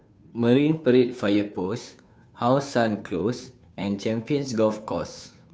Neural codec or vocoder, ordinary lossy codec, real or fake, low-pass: codec, 16 kHz, 2 kbps, FunCodec, trained on Chinese and English, 25 frames a second; none; fake; none